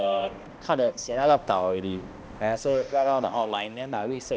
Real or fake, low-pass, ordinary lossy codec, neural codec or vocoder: fake; none; none; codec, 16 kHz, 1 kbps, X-Codec, HuBERT features, trained on balanced general audio